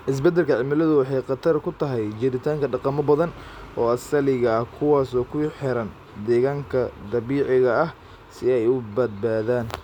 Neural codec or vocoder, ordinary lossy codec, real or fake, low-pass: none; none; real; 19.8 kHz